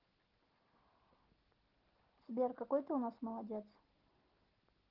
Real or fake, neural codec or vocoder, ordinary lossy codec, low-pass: real; none; Opus, 16 kbps; 5.4 kHz